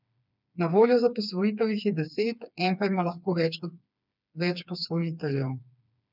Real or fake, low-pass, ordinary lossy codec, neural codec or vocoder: fake; 5.4 kHz; none; codec, 16 kHz, 4 kbps, FreqCodec, smaller model